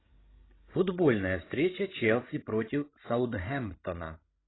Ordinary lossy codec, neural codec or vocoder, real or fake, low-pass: AAC, 16 kbps; codec, 16 kHz, 16 kbps, FreqCodec, larger model; fake; 7.2 kHz